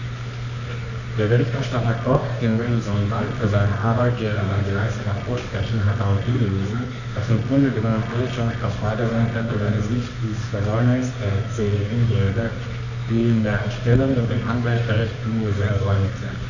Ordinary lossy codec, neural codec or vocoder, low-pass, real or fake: none; codec, 24 kHz, 0.9 kbps, WavTokenizer, medium music audio release; 7.2 kHz; fake